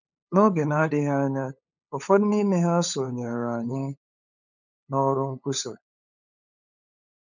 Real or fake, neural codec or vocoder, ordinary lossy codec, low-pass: fake; codec, 16 kHz, 8 kbps, FunCodec, trained on LibriTTS, 25 frames a second; none; 7.2 kHz